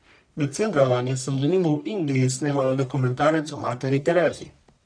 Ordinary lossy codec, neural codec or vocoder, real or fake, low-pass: none; codec, 44.1 kHz, 1.7 kbps, Pupu-Codec; fake; 9.9 kHz